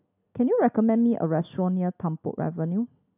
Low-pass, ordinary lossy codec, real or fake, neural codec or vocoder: 3.6 kHz; none; real; none